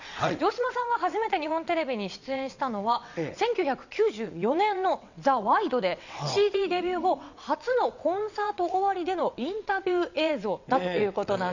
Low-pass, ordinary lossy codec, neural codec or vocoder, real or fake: 7.2 kHz; none; vocoder, 22.05 kHz, 80 mel bands, WaveNeXt; fake